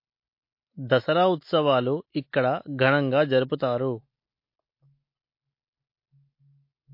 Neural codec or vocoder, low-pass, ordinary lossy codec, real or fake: none; 5.4 kHz; MP3, 32 kbps; real